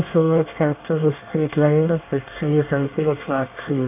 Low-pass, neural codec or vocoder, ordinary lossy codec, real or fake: 3.6 kHz; codec, 24 kHz, 1 kbps, SNAC; none; fake